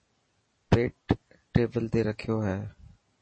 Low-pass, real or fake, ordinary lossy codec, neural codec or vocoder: 9.9 kHz; real; MP3, 32 kbps; none